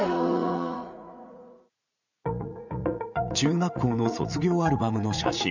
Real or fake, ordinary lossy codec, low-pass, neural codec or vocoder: fake; none; 7.2 kHz; vocoder, 44.1 kHz, 80 mel bands, Vocos